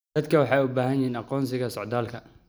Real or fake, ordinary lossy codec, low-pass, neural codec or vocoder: real; none; none; none